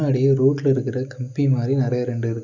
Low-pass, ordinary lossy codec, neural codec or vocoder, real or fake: 7.2 kHz; none; none; real